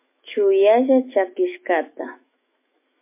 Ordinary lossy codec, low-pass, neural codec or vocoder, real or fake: MP3, 24 kbps; 3.6 kHz; autoencoder, 48 kHz, 128 numbers a frame, DAC-VAE, trained on Japanese speech; fake